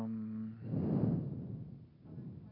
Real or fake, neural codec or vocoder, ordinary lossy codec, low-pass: real; none; none; 5.4 kHz